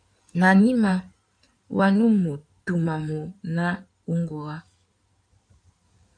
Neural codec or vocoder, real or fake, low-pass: codec, 16 kHz in and 24 kHz out, 2.2 kbps, FireRedTTS-2 codec; fake; 9.9 kHz